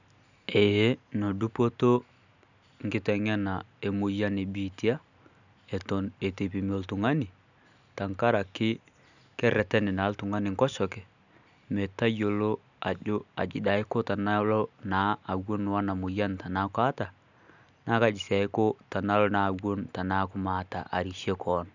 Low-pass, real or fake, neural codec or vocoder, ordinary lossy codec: 7.2 kHz; real; none; none